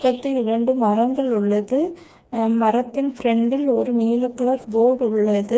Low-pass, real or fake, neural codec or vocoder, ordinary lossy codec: none; fake; codec, 16 kHz, 2 kbps, FreqCodec, smaller model; none